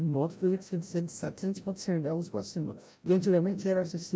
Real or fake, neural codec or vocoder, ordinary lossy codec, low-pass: fake; codec, 16 kHz, 0.5 kbps, FreqCodec, larger model; none; none